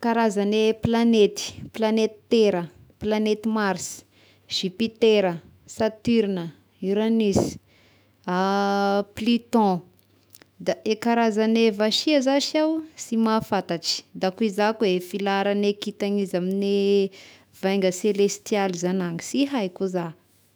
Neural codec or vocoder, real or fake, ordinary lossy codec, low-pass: autoencoder, 48 kHz, 128 numbers a frame, DAC-VAE, trained on Japanese speech; fake; none; none